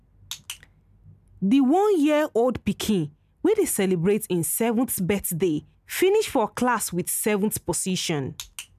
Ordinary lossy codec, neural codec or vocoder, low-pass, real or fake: none; none; 14.4 kHz; real